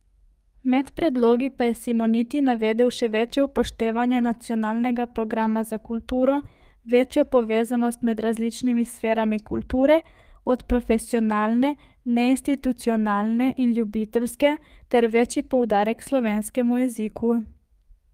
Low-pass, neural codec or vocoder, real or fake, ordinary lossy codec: 14.4 kHz; codec, 32 kHz, 1.9 kbps, SNAC; fake; Opus, 32 kbps